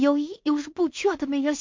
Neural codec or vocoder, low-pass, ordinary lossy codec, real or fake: codec, 16 kHz in and 24 kHz out, 0.4 kbps, LongCat-Audio-Codec, two codebook decoder; 7.2 kHz; MP3, 48 kbps; fake